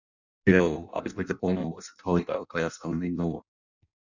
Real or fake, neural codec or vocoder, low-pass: fake; codec, 16 kHz in and 24 kHz out, 0.6 kbps, FireRedTTS-2 codec; 7.2 kHz